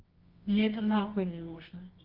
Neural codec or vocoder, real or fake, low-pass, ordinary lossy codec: codec, 24 kHz, 0.9 kbps, WavTokenizer, medium music audio release; fake; 5.4 kHz; Opus, 32 kbps